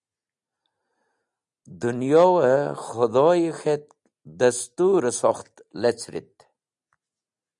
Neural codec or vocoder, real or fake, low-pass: none; real; 10.8 kHz